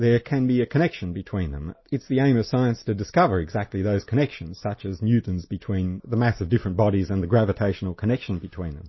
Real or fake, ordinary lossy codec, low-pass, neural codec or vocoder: real; MP3, 24 kbps; 7.2 kHz; none